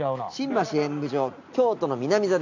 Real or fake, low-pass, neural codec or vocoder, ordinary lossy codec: real; 7.2 kHz; none; none